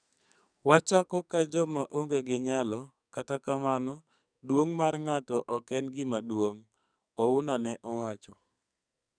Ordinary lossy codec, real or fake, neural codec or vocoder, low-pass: none; fake; codec, 44.1 kHz, 2.6 kbps, SNAC; 9.9 kHz